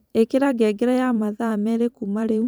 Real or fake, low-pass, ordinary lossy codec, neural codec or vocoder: fake; none; none; vocoder, 44.1 kHz, 128 mel bands every 512 samples, BigVGAN v2